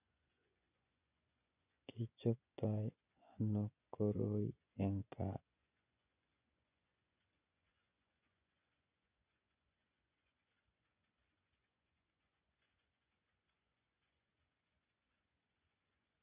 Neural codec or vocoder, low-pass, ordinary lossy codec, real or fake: vocoder, 24 kHz, 100 mel bands, Vocos; 3.6 kHz; none; fake